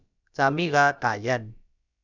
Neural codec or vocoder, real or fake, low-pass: codec, 16 kHz, about 1 kbps, DyCAST, with the encoder's durations; fake; 7.2 kHz